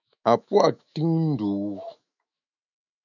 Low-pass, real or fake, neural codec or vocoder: 7.2 kHz; fake; autoencoder, 48 kHz, 128 numbers a frame, DAC-VAE, trained on Japanese speech